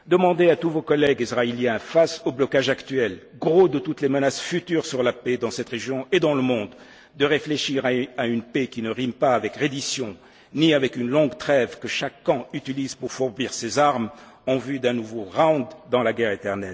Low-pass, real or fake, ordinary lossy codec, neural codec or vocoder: none; real; none; none